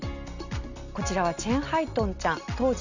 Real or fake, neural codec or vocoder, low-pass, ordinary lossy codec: real; none; 7.2 kHz; none